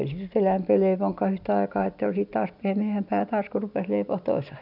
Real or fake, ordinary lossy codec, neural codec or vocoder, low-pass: real; none; none; 5.4 kHz